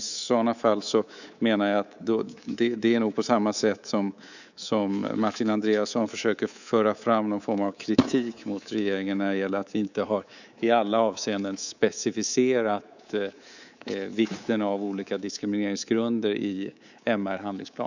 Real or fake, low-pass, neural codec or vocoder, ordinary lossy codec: fake; 7.2 kHz; codec, 24 kHz, 3.1 kbps, DualCodec; none